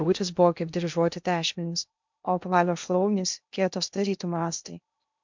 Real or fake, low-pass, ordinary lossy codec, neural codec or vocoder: fake; 7.2 kHz; MP3, 64 kbps; codec, 16 kHz in and 24 kHz out, 0.6 kbps, FocalCodec, streaming, 2048 codes